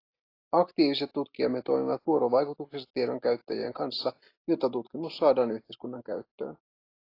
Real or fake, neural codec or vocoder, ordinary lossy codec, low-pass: real; none; AAC, 32 kbps; 5.4 kHz